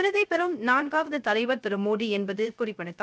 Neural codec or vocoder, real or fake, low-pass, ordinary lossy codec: codec, 16 kHz, 0.3 kbps, FocalCodec; fake; none; none